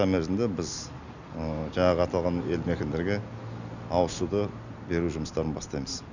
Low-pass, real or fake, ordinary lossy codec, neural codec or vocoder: 7.2 kHz; real; none; none